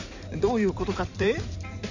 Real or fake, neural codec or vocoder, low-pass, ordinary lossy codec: fake; codec, 16 kHz in and 24 kHz out, 2.2 kbps, FireRedTTS-2 codec; 7.2 kHz; none